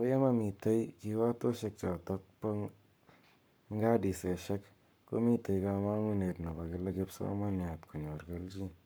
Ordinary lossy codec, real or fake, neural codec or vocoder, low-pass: none; fake; codec, 44.1 kHz, 7.8 kbps, Pupu-Codec; none